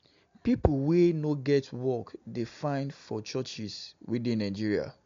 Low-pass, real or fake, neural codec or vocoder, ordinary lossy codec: 7.2 kHz; real; none; MP3, 64 kbps